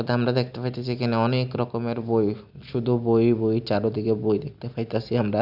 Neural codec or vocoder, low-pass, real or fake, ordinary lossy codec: none; 5.4 kHz; real; none